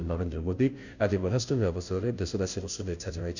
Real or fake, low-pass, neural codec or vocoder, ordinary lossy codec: fake; 7.2 kHz; codec, 16 kHz, 0.5 kbps, FunCodec, trained on Chinese and English, 25 frames a second; none